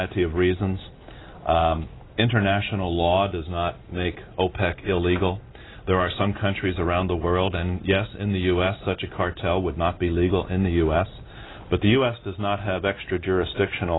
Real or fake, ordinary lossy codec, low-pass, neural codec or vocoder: real; AAC, 16 kbps; 7.2 kHz; none